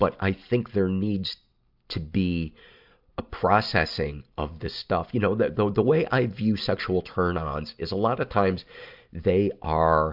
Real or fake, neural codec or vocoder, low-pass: real; none; 5.4 kHz